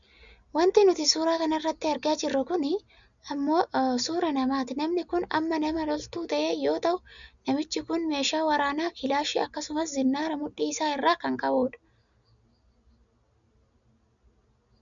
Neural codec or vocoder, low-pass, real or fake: none; 7.2 kHz; real